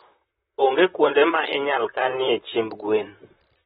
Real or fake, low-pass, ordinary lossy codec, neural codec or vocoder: fake; 19.8 kHz; AAC, 16 kbps; vocoder, 44.1 kHz, 128 mel bands, Pupu-Vocoder